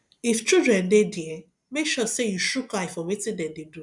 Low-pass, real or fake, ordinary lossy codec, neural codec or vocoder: 10.8 kHz; real; none; none